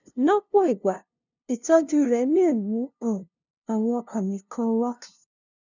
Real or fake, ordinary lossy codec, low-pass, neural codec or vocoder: fake; none; 7.2 kHz; codec, 16 kHz, 0.5 kbps, FunCodec, trained on LibriTTS, 25 frames a second